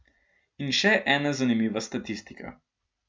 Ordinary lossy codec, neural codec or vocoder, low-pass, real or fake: none; none; none; real